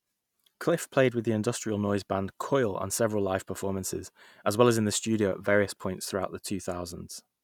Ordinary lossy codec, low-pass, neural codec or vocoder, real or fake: none; 19.8 kHz; none; real